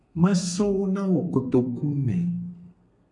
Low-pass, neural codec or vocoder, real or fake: 10.8 kHz; codec, 32 kHz, 1.9 kbps, SNAC; fake